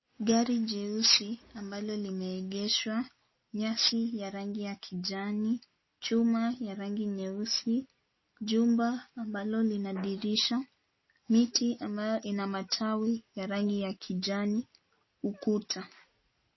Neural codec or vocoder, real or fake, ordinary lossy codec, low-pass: none; real; MP3, 24 kbps; 7.2 kHz